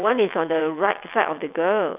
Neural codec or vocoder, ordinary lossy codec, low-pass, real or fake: vocoder, 22.05 kHz, 80 mel bands, WaveNeXt; none; 3.6 kHz; fake